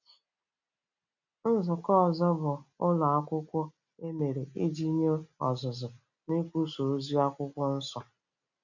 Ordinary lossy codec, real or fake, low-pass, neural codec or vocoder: none; real; 7.2 kHz; none